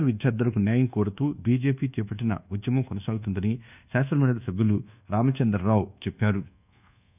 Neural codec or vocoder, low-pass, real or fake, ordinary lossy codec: codec, 24 kHz, 1.2 kbps, DualCodec; 3.6 kHz; fake; none